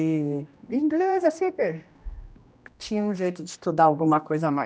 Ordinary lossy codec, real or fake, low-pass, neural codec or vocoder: none; fake; none; codec, 16 kHz, 1 kbps, X-Codec, HuBERT features, trained on general audio